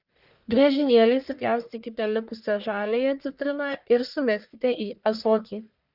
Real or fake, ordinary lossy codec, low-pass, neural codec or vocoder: fake; Opus, 64 kbps; 5.4 kHz; codec, 44.1 kHz, 1.7 kbps, Pupu-Codec